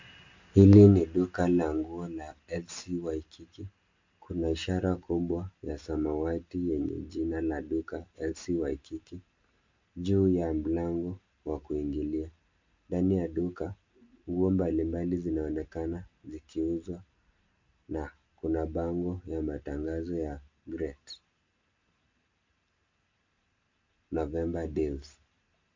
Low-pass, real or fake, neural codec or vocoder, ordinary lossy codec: 7.2 kHz; real; none; MP3, 48 kbps